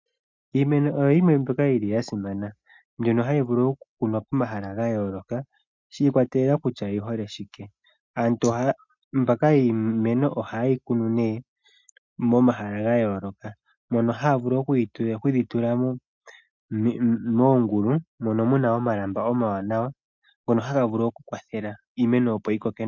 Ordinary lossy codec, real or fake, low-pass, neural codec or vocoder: MP3, 64 kbps; real; 7.2 kHz; none